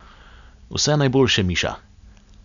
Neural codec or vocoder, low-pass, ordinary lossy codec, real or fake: none; 7.2 kHz; none; real